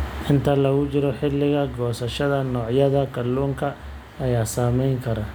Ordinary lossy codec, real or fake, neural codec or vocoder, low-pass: none; real; none; none